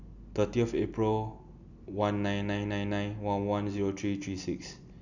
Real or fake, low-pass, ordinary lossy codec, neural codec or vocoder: real; 7.2 kHz; none; none